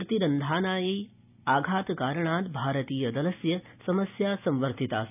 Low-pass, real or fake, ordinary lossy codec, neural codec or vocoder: 3.6 kHz; real; none; none